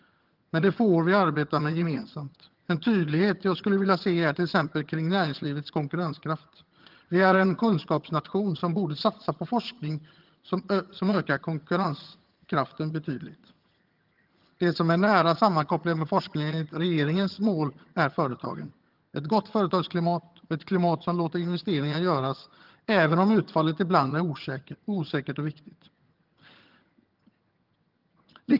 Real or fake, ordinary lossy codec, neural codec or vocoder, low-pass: fake; Opus, 32 kbps; vocoder, 22.05 kHz, 80 mel bands, HiFi-GAN; 5.4 kHz